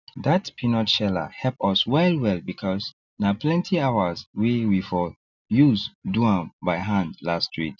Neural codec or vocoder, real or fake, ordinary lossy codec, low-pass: none; real; none; 7.2 kHz